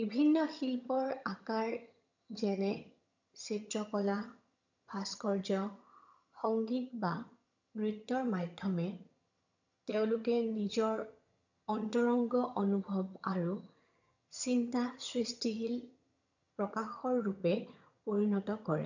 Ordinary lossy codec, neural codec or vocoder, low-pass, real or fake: none; vocoder, 22.05 kHz, 80 mel bands, HiFi-GAN; 7.2 kHz; fake